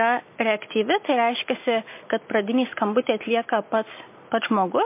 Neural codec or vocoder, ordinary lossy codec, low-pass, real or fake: none; MP3, 24 kbps; 3.6 kHz; real